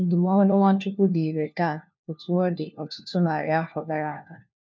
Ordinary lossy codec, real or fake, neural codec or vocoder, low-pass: MP3, 64 kbps; fake; codec, 16 kHz, 1 kbps, FunCodec, trained on LibriTTS, 50 frames a second; 7.2 kHz